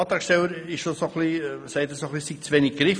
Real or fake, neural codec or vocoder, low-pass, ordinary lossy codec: real; none; 9.9 kHz; none